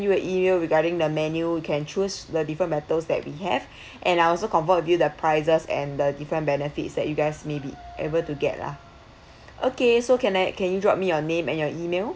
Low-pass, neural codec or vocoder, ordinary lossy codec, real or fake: none; none; none; real